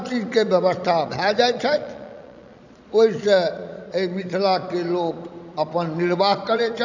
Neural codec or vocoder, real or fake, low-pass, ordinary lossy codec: vocoder, 22.05 kHz, 80 mel bands, Vocos; fake; 7.2 kHz; none